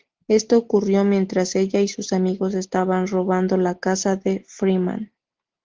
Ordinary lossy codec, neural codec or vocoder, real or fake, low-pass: Opus, 16 kbps; none; real; 7.2 kHz